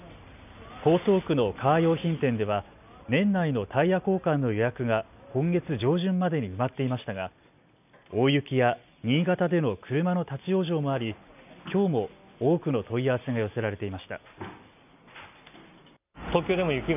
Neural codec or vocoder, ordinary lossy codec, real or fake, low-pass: none; none; real; 3.6 kHz